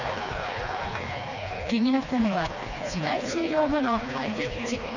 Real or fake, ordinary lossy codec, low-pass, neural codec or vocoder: fake; none; 7.2 kHz; codec, 16 kHz, 2 kbps, FreqCodec, smaller model